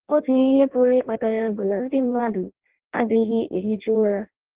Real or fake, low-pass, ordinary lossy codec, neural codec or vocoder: fake; 3.6 kHz; Opus, 16 kbps; codec, 16 kHz in and 24 kHz out, 0.6 kbps, FireRedTTS-2 codec